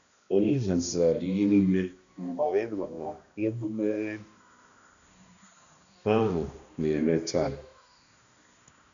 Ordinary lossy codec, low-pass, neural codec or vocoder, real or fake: none; 7.2 kHz; codec, 16 kHz, 1 kbps, X-Codec, HuBERT features, trained on balanced general audio; fake